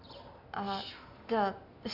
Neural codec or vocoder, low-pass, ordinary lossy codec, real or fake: none; 5.4 kHz; none; real